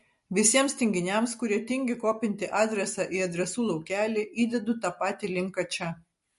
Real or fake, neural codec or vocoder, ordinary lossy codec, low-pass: real; none; MP3, 48 kbps; 14.4 kHz